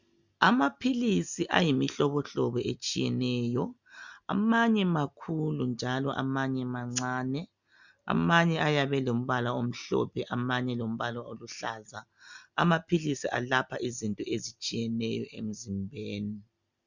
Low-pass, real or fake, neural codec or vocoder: 7.2 kHz; real; none